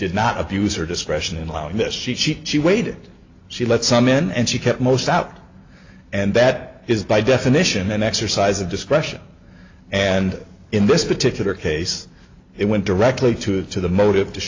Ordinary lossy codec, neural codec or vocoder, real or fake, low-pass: AAC, 48 kbps; none; real; 7.2 kHz